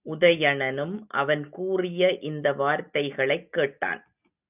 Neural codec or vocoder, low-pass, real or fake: none; 3.6 kHz; real